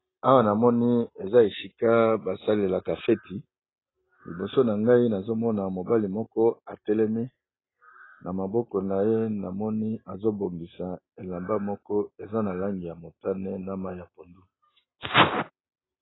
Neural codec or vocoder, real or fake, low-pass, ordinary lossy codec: none; real; 7.2 kHz; AAC, 16 kbps